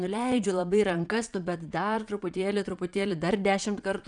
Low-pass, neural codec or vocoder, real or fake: 9.9 kHz; vocoder, 22.05 kHz, 80 mel bands, Vocos; fake